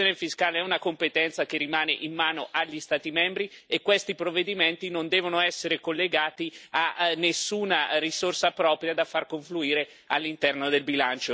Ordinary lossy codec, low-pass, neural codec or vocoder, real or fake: none; none; none; real